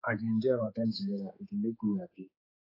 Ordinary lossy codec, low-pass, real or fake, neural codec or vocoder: AAC, 24 kbps; 5.4 kHz; fake; codec, 16 kHz, 4 kbps, X-Codec, HuBERT features, trained on general audio